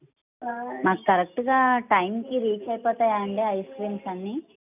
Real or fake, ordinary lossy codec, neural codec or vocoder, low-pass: real; none; none; 3.6 kHz